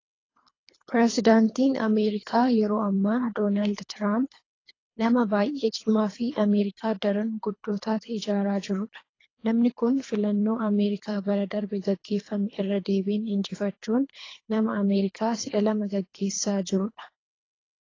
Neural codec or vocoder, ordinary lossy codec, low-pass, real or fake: codec, 24 kHz, 3 kbps, HILCodec; AAC, 32 kbps; 7.2 kHz; fake